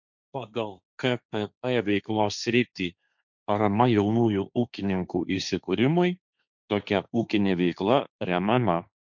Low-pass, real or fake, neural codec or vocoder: 7.2 kHz; fake; codec, 16 kHz, 1.1 kbps, Voila-Tokenizer